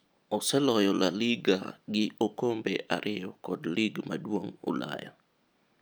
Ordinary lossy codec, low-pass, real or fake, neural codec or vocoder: none; none; real; none